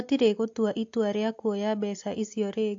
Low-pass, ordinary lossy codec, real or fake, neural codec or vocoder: 7.2 kHz; AAC, 48 kbps; real; none